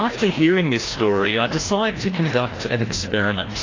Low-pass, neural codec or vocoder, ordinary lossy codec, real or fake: 7.2 kHz; codec, 16 kHz, 1 kbps, FreqCodec, larger model; AAC, 32 kbps; fake